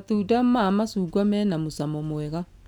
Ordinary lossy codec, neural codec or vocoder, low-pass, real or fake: none; none; 19.8 kHz; real